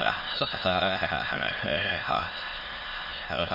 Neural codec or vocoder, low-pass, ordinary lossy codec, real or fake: autoencoder, 22.05 kHz, a latent of 192 numbers a frame, VITS, trained on many speakers; 5.4 kHz; MP3, 24 kbps; fake